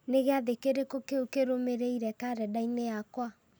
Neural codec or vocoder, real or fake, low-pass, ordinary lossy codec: none; real; none; none